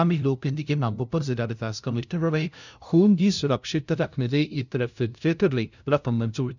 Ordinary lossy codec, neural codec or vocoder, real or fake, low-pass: none; codec, 16 kHz, 0.5 kbps, FunCodec, trained on LibriTTS, 25 frames a second; fake; 7.2 kHz